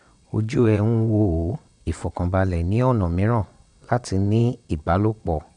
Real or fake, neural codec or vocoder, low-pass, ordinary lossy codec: fake; vocoder, 22.05 kHz, 80 mel bands, WaveNeXt; 9.9 kHz; none